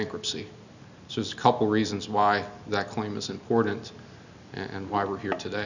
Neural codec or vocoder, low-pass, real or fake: none; 7.2 kHz; real